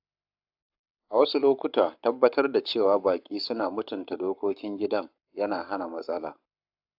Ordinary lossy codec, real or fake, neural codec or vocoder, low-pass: AAC, 48 kbps; fake; codec, 44.1 kHz, 7.8 kbps, Pupu-Codec; 5.4 kHz